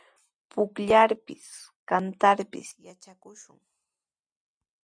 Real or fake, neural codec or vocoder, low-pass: real; none; 9.9 kHz